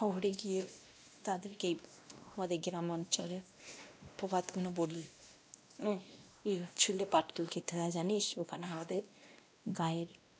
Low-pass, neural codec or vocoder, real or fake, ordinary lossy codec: none; codec, 16 kHz, 1 kbps, X-Codec, WavLM features, trained on Multilingual LibriSpeech; fake; none